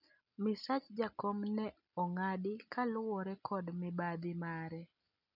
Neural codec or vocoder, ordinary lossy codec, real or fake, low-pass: none; none; real; 5.4 kHz